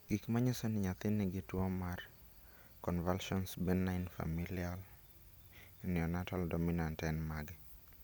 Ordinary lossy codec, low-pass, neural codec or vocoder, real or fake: none; none; none; real